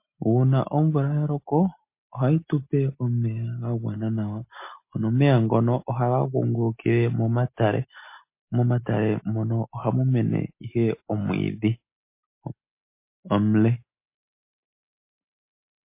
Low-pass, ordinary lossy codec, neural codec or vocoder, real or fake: 3.6 kHz; MP3, 24 kbps; none; real